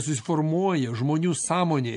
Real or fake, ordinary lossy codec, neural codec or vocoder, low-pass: real; AAC, 64 kbps; none; 10.8 kHz